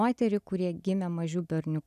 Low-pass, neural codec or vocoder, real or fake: 14.4 kHz; none; real